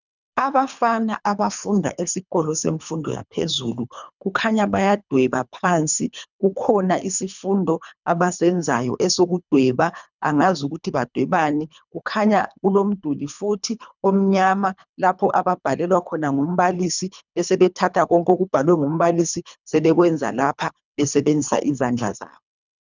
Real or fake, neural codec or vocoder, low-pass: fake; codec, 24 kHz, 3 kbps, HILCodec; 7.2 kHz